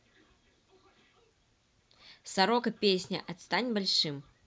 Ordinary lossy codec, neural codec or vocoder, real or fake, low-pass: none; none; real; none